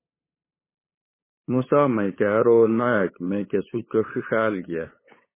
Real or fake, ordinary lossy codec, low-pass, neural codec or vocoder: fake; MP3, 16 kbps; 3.6 kHz; codec, 16 kHz, 8 kbps, FunCodec, trained on LibriTTS, 25 frames a second